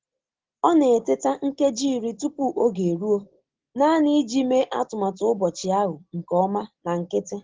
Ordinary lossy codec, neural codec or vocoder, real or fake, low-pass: Opus, 16 kbps; none; real; 7.2 kHz